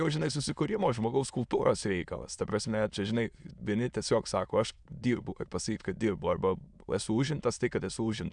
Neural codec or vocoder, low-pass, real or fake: autoencoder, 22.05 kHz, a latent of 192 numbers a frame, VITS, trained on many speakers; 9.9 kHz; fake